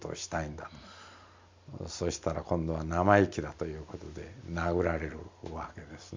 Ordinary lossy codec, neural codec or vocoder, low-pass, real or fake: MP3, 64 kbps; none; 7.2 kHz; real